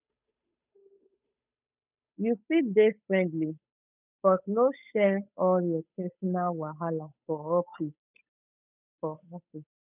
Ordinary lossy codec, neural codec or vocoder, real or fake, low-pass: none; codec, 16 kHz, 8 kbps, FunCodec, trained on Chinese and English, 25 frames a second; fake; 3.6 kHz